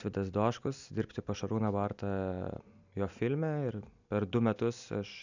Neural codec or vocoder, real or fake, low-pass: none; real; 7.2 kHz